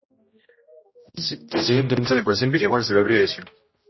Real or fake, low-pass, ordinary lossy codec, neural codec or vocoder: fake; 7.2 kHz; MP3, 24 kbps; codec, 16 kHz, 0.5 kbps, X-Codec, HuBERT features, trained on general audio